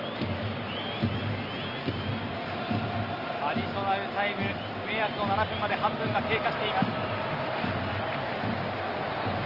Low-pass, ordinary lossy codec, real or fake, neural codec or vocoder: 5.4 kHz; Opus, 24 kbps; real; none